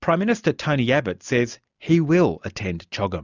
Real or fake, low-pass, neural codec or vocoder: real; 7.2 kHz; none